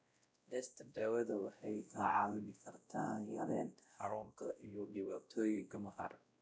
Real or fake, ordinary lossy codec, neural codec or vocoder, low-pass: fake; none; codec, 16 kHz, 0.5 kbps, X-Codec, WavLM features, trained on Multilingual LibriSpeech; none